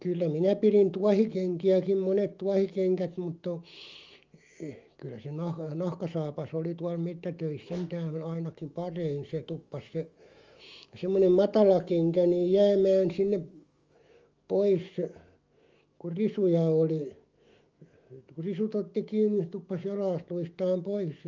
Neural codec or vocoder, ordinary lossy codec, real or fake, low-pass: autoencoder, 48 kHz, 128 numbers a frame, DAC-VAE, trained on Japanese speech; Opus, 32 kbps; fake; 7.2 kHz